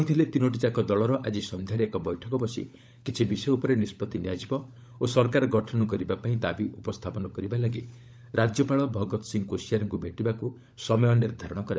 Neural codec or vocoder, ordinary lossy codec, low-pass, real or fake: codec, 16 kHz, 16 kbps, FunCodec, trained on LibriTTS, 50 frames a second; none; none; fake